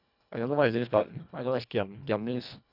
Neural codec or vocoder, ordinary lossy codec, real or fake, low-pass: codec, 24 kHz, 1.5 kbps, HILCodec; none; fake; 5.4 kHz